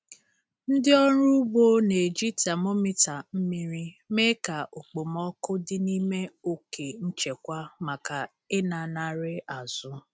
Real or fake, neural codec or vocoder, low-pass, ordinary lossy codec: real; none; none; none